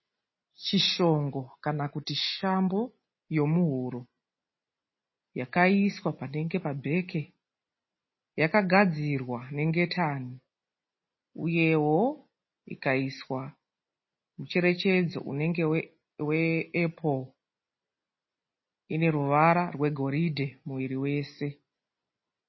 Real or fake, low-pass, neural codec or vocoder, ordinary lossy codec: real; 7.2 kHz; none; MP3, 24 kbps